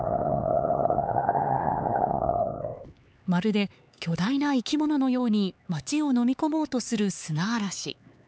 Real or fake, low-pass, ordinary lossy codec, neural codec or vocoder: fake; none; none; codec, 16 kHz, 4 kbps, X-Codec, HuBERT features, trained on LibriSpeech